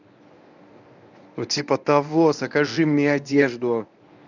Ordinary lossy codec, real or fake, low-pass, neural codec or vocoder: none; fake; 7.2 kHz; codec, 24 kHz, 0.9 kbps, WavTokenizer, medium speech release version 1